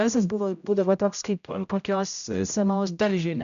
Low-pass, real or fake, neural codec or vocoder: 7.2 kHz; fake; codec, 16 kHz, 0.5 kbps, X-Codec, HuBERT features, trained on general audio